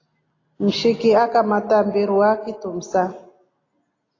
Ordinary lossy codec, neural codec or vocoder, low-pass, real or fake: AAC, 32 kbps; none; 7.2 kHz; real